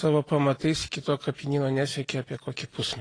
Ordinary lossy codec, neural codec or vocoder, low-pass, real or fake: AAC, 32 kbps; none; 9.9 kHz; real